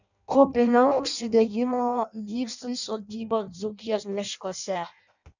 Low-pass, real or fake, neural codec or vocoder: 7.2 kHz; fake; codec, 16 kHz in and 24 kHz out, 0.6 kbps, FireRedTTS-2 codec